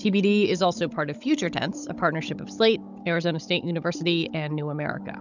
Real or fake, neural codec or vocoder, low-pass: fake; codec, 16 kHz, 16 kbps, FunCodec, trained on Chinese and English, 50 frames a second; 7.2 kHz